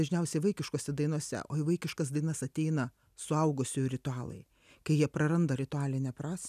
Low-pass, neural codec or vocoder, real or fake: 14.4 kHz; none; real